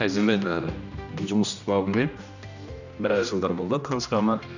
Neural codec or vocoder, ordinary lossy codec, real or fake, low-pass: codec, 16 kHz, 1 kbps, X-Codec, HuBERT features, trained on general audio; none; fake; 7.2 kHz